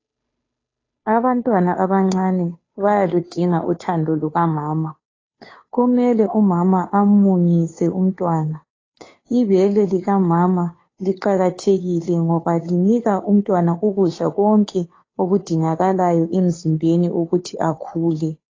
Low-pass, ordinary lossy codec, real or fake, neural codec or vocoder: 7.2 kHz; AAC, 32 kbps; fake; codec, 16 kHz, 2 kbps, FunCodec, trained on Chinese and English, 25 frames a second